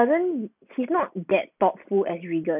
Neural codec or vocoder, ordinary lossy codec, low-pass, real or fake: none; none; 3.6 kHz; real